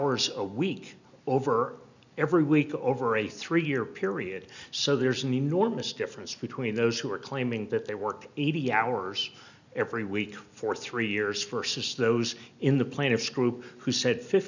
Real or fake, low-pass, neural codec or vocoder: real; 7.2 kHz; none